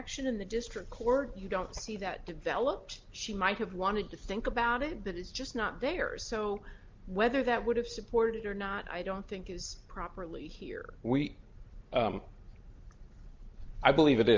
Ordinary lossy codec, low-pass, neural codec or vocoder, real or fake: Opus, 32 kbps; 7.2 kHz; none; real